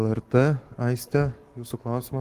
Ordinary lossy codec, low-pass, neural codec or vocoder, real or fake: Opus, 24 kbps; 14.4 kHz; codec, 44.1 kHz, 7.8 kbps, Pupu-Codec; fake